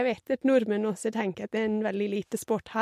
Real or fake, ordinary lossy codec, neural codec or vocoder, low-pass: real; MP3, 64 kbps; none; 14.4 kHz